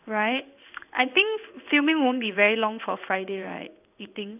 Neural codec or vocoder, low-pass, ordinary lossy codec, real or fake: codec, 16 kHz in and 24 kHz out, 1 kbps, XY-Tokenizer; 3.6 kHz; none; fake